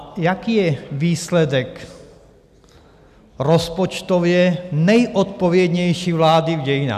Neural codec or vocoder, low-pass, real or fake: none; 14.4 kHz; real